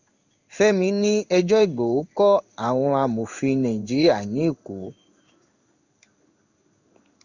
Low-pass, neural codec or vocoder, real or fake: 7.2 kHz; codec, 16 kHz in and 24 kHz out, 1 kbps, XY-Tokenizer; fake